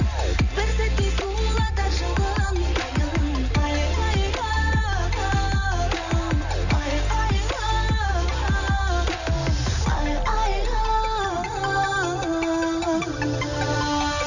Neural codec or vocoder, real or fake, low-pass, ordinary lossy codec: none; real; 7.2 kHz; none